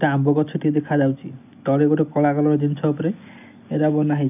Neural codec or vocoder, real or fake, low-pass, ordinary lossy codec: none; real; 3.6 kHz; none